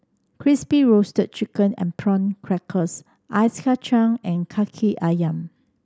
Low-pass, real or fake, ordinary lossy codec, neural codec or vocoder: none; real; none; none